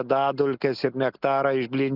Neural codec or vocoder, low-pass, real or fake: none; 5.4 kHz; real